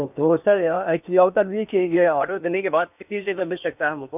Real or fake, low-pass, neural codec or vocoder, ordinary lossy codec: fake; 3.6 kHz; codec, 16 kHz in and 24 kHz out, 0.8 kbps, FocalCodec, streaming, 65536 codes; none